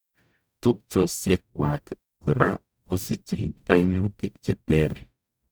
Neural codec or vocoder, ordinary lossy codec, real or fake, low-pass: codec, 44.1 kHz, 0.9 kbps, DAC; none; fake; none